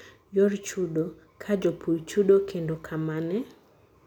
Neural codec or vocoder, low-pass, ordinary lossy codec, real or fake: none; 19.8 kHz; none; real